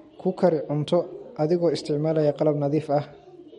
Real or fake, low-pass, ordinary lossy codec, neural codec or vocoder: real; 19.8 kHz; MP3, 48 kbps; none